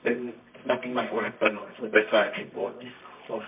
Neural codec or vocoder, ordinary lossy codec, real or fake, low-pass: codec, 24 kHz, 0.9 kbps, WavTokenizer, medium music audio release; MP3, 32 kbps; fake; 3.6 kHz